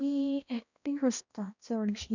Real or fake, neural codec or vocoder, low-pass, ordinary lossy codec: fake; codec, 16 kHz, 1 kbps, X-Codec, HuBERT features, trained on balanced general audio; 7.2 kHz; none